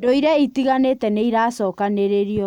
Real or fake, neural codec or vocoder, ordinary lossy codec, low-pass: real; none; none; 19.8 kHz